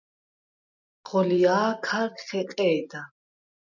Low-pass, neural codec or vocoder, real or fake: 7.2 kHz; none; real